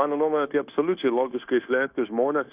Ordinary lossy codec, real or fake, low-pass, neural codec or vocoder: Opus, 64 kbps; fake; 3.6 kHz; codec, 16 kHz, 0.9 kbps, LongCat-Audio-Codec